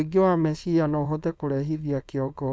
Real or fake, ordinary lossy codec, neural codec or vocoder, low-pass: fake; none; codec, 16 kHz, 4.8 kbps, FACodec; none